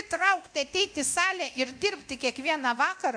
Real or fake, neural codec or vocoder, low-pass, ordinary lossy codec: fake; codec, 24 kHz, 0.9 kbps, DualCodec; 9.9 kHz; MP3, 64 kbps